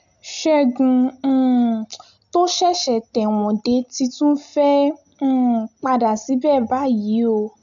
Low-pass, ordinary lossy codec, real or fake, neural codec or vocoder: 7.2 kHz; none; real; none